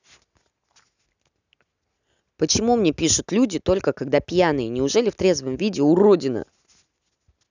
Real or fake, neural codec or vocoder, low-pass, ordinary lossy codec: real; none; 7.2 kHz; none